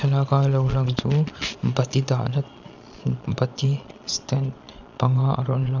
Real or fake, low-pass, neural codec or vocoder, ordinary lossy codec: fake; 7.2 kHz; vocoder, 22.05 kHz, 80 mel bands, Vocos; none